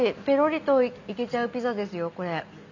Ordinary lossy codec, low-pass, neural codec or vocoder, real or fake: none; 7.2 kHz; none; real